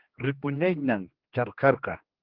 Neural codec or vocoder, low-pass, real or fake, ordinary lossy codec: codec, 16 kHz, 2 kbps, X-Codec, HuBERT features, trained on general audio; 5.4 kHz; fake; Opus, 16 kbps